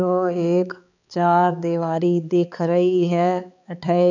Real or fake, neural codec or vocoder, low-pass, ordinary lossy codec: fake; codec, 16 kHz, 4 kbps, X-Codec, HuBERT features, trained on balanced general audio; 7.2 kHz; none